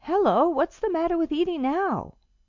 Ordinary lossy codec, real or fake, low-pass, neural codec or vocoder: MP3, 64 kbps; real; 7.2 kHz; none